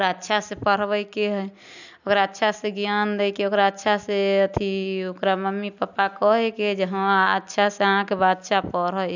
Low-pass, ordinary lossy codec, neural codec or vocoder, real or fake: 7.2 kHz; none; none; real